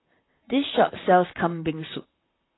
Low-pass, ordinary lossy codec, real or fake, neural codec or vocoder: 7.2 kHz; AAC, 16 kbps; fake; vocoder, 44.1 kHz, 128 mel bands, Pupu-Vocoder